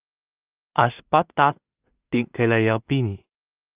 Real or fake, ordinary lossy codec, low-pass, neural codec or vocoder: fake; Opus, 32 kbps; 3.6 kHz; codec, 16 kHz in and 24 kHz out, 0.4 kbps, LongCat-Audio-Codec, two codebook decoder